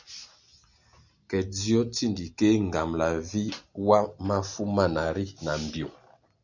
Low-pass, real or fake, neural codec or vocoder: 7.2 kHz; real; none